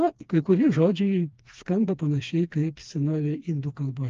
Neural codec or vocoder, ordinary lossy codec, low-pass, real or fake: codec, 16 kHz, 2 kbps, FreqCodec, smaller model; Opus, 32 kbps; 7.2 kHz; fake